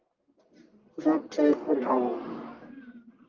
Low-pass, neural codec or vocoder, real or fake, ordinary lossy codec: 7.2 kHz; codec, 44.1 kHz, 1.7 kbps, Pupu-Codec; fake; Opus, 32 kbps